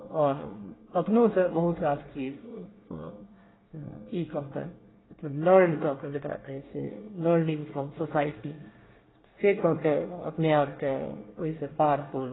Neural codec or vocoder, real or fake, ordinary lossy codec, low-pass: codec, 24 kHz, 1 kbps, SNAC; fake; AAC, 16 kbps; 7.2 kHz